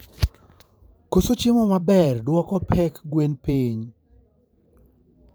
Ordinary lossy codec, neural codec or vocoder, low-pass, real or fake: none; vocoder, 44.1 kHz, 128 mel bands every 512 samples, BigVGAN v2; none; fake